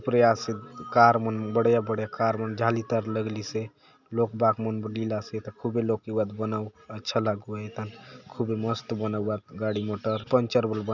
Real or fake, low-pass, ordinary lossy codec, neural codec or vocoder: real; 7.2 kHz; none; none